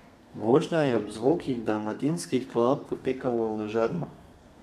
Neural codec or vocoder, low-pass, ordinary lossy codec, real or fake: codec, 32 kHz, 1.9 kbps, SNAC; 14.4 kHz; none; fake